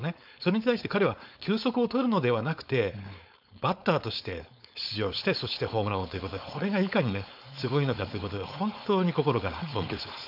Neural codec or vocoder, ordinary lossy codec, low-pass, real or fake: codec, 16 kHz, 4.8 kbps, FACodec; none; 5.4 kHz; fake